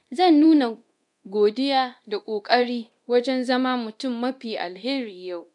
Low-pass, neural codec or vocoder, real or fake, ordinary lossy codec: 10.8 kHz; codec, 24 kHz, 0.9 kbps, DualCodec; fake; none